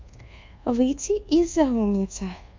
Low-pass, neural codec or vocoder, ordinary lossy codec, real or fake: 7.2 kHz; codec, 24 kHz, 1.2 kbps, DualCodec; MP3, 48 kbps; fake